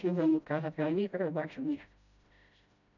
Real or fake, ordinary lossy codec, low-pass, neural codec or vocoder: fake; none; 7.2 kHz; codec, 16 kHz, 0.5 kbps, FreqCodec, smaller model